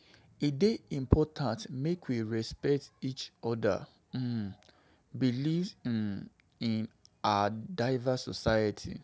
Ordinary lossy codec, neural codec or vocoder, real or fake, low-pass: none; none; real; none